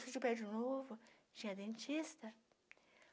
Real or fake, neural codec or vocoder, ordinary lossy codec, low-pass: real; none; none; none